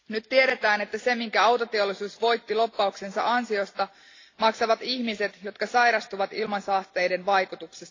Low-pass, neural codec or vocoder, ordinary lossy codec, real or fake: 7.2 kHz; none; AAC, 32 kbps; real